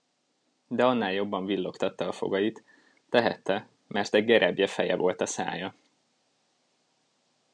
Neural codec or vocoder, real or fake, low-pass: none; real; 9.9 kHz